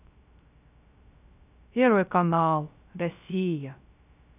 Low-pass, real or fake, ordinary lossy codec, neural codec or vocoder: 3.6 kHz; fake; none; codec, 16 kHz, 0.3 kbps, FocalCodec